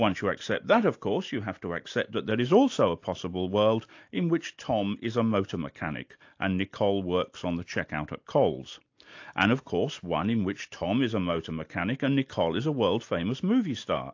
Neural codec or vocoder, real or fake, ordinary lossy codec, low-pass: none; real; AAC, 48 kbps; 7.2 kHz